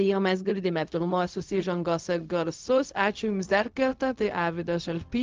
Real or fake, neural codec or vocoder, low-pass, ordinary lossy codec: fake; codec, 16 kHz, 0.4 kbps, LongCat-Audio-Codec; 7.2 kHz; Opus, 24 kbps